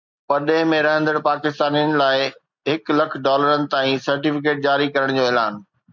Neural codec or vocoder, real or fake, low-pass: none; real; 7.2 kHz